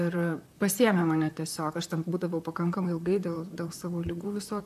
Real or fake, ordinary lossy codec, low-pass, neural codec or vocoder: fake; AAC, 96 kbps; 14.4 kHz; vocoder, 44.1 kHz, 128 mel bands, Pupu-Vocoder